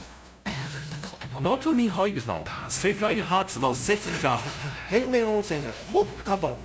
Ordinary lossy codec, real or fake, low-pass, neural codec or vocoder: none; fake; none; codec, 16 kHz, 0.5 kbps, FunCodec, trained on LibriTTS, 25 frames a second